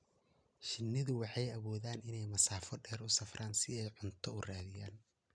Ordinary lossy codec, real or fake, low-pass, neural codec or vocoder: none; real; 9.9 kHz; none